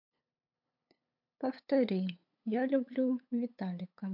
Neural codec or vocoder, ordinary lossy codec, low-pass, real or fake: codec, 16 kHz, 8 kbps, FunCodec, trained on LibriTTS, 25 frames a second; MP3, 48 kbps; 5.4 kHz; fake